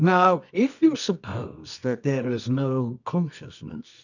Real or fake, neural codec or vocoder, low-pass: fake; codec, 24 kHz, 0.9 kbps, WavTokenizer, medium music audio release; 7.2 kHz